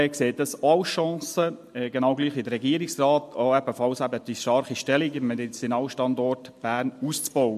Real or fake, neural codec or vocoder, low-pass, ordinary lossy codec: real; none; 14.4 kHz; MP3, 64 kbps